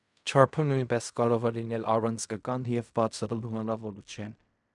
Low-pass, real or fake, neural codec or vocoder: 10.8 kHz; fake; codec, 16 kHz in and 24 kHz out, 0.4 kbps, LongCat-Audio-Codec, fine tuned four codebook decoder